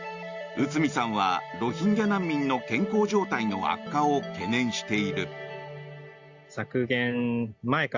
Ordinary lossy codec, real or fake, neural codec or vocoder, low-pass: Opus, 64 kbps; real; none; 7.2 kHz